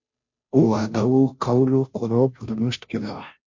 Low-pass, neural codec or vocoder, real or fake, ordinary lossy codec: 7.2 kHz; codec, 16 kHz, 0.5 kbps, FunCodec, trained on Chinese and English, 25 frames a second; fake; MP3, 48 kbps